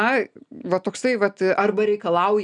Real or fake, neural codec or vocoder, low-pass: real; none; 9.9 kHz